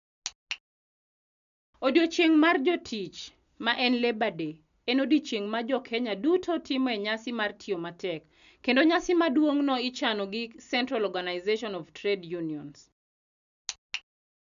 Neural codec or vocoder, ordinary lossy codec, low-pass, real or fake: none; none; 7.2 kHz; real